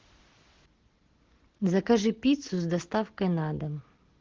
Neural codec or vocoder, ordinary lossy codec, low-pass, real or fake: none; Opus, 16 kbps; 7.2 kHz; real